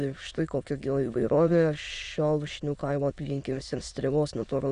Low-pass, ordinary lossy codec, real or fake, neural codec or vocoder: 9.9 kHz; Opus, 64 kbps; fake; autoencoder, 22.05 kHz, a latent of 192 numbers a frame, VITS, trained on many speakers